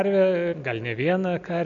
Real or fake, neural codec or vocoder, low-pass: real; none; 7.2 kHz